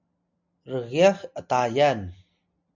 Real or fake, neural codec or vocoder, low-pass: real; none; 7.2 kHz